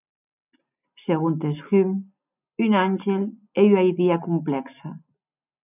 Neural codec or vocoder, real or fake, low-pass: none; real; 3.6 kHz